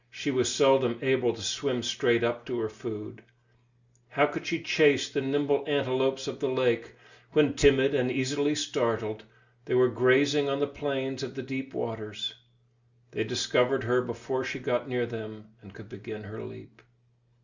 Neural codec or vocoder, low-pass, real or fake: none; 7.2 kHz; real